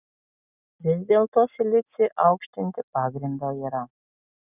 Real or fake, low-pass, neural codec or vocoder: real; 3.6 kHz; none